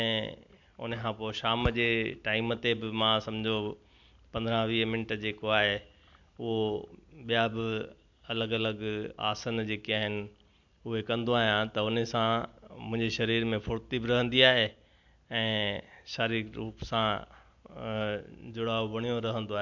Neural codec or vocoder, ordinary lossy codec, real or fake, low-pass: none; MP3, 64 kbps; real; 7.2 kHz